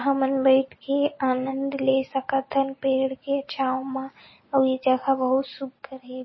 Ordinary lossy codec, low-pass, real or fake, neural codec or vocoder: MP3, 24 kbps; 7.2 kHz; real; none